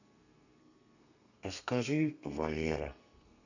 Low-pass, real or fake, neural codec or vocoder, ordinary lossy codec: 7.2 kHz; fake; codec, 32 kHz, 1.9 kbps, SNAC; none